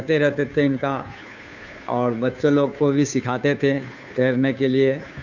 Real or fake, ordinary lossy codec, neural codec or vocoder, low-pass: fake; none; codec, 16 kHz, 2 kbps, FunCodec, trained on Chinese and English, 25 frames a second; 7.2 kHz